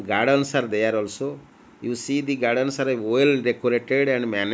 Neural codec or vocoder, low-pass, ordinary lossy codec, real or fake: none; none; none; real